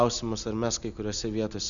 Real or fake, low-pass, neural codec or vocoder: real; 7.2 kHz; none